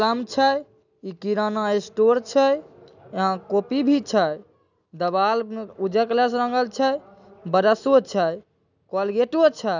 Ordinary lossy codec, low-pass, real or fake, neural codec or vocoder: none; 7.2 kHz; real; none